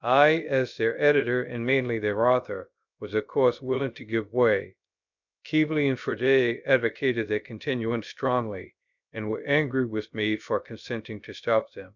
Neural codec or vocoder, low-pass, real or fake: codec, 16 kHz, 0.3 kbps, FocalCodec; 7.2 kHz; fake